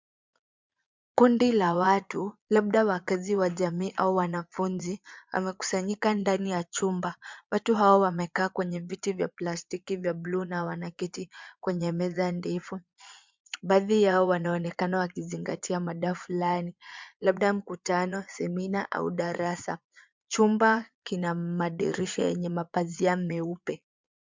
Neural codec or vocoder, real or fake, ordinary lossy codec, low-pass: vocoder, 22.05 kHz, 80 mel bands, Vocos; fake; MP3, 64 kbps; 7.2 kHz